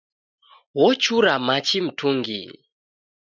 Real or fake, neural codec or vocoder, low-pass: real; none; 7.2 kHz